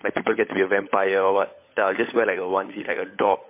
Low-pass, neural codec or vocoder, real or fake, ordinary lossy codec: 3.6 kHz; codec, 16 kHz, 16 kbps, FunCodec, trained on LibriTTS, 50 frames a second; fake; MP3, 24 kbps